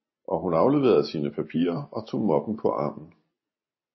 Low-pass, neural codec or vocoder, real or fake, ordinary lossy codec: 7.2 kHz; none; real; MP3, 24 kbps